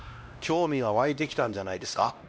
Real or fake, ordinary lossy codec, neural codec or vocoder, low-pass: fake; none; codec, 16 kHz, 1 kbps, X-Codec, HuBERT features, trained on LibriSpeech; none